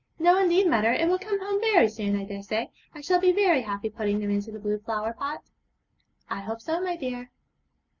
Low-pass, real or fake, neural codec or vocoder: 7.2 kHz; real; none